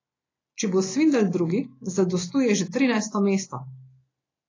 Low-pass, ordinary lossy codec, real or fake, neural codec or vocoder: 7.2 kHz; AAC, 32 kbps; real; none